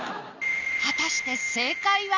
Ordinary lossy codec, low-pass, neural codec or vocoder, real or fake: none; 7.2 kHz; none; real